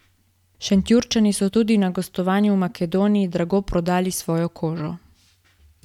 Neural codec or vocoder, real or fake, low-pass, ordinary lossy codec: none; real; 19.8 kHz; none